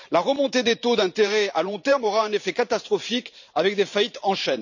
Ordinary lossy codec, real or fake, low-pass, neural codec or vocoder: none; fake; 7.2 kHz; vocoder, 44.1 kHz, 128 mel bands every 512 samples, BigVGAN v2